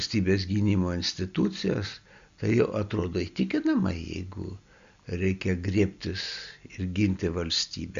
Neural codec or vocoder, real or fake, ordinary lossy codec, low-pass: none; real; Opus, 64 kbps; 7.2 kHz